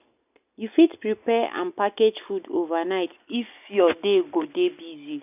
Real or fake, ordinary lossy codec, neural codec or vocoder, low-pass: real; none; none; 3.6 kHz